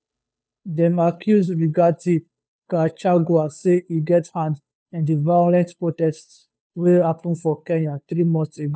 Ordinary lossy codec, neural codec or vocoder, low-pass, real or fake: none; codec, 16 kHz, 2 kbps, FunCodec, trained on Chinese and English, 25 frames a second; none; fake